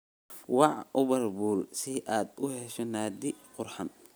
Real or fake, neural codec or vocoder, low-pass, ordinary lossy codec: real; none; none; none